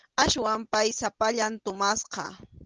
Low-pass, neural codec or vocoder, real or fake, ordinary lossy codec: 7.2 kHz; none; real; Opus, 16 kbps